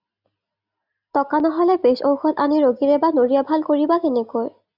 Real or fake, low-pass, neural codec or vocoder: real; 5.4 kHz; none